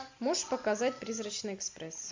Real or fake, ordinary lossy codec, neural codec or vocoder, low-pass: real; MP3, 64 kbps; none; 7.2 kHz